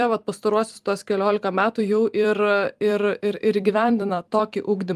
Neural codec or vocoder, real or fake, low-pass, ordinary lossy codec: vocoder, 44.1 kHz, 128 mel bands every 256 samples, BigVGAN v2; fake; 14.4 kHz; Opus, 32 kbps